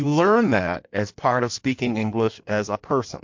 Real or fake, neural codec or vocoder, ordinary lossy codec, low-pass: fake; codec, 16 kHz in and 24 kHz out, 1.1 kbps, FireRedTTS-2 codec; MP3, 48 kbps; 7.2 kHz